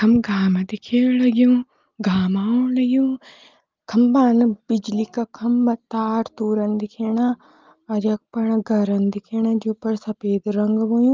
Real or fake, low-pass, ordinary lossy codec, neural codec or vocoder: real; 7.2 kHz; Opus, 32 kbps; none